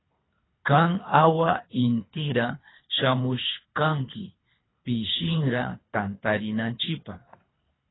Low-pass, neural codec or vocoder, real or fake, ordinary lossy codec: 7.2 kHz; codec, 24 kHz, 3 kbps, HILCodec; fake; AAC, 16 kbps